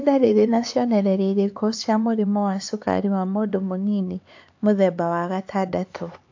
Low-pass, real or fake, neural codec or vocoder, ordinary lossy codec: 7.2 kHz; fake; codec, 16 kHz, 4 kbps, X-Codec, HuBERT features, trained on LibriSpeech; AAC, 48 kbps